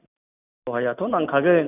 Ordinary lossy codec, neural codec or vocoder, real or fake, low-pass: none; none; real; 3.6 kHz